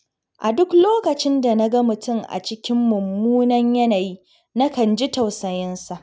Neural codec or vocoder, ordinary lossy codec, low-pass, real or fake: none; none; none; real